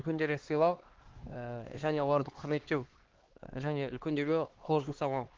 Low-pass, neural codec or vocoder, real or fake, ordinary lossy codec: 7.2 kHz; codec, 16 kHz, 2 kbps, X-Codec, HuBERT features, trained on LibriSpeech; fake; Opus, 16 kbps